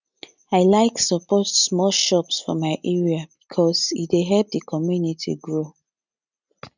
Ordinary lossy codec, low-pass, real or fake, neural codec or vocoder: none; 7.2 kHz; real; none